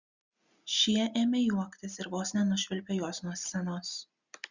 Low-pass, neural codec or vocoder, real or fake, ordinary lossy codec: 7.2 kHz; none; real; Opus, 64 kbps